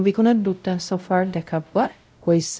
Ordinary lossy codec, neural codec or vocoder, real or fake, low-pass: none; codec, 16 kHz, 0.5 kbps, X-Codec, WavLM features, trained on Multilingual LibriSpeech; fake; none